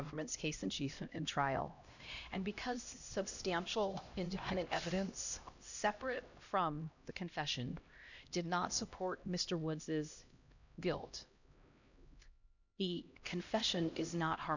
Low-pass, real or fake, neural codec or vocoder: 7.2 kHz; fake; codec, 16 kHz, 1 kbps, X-Codec, HuBERT features, trained on LibriSpeech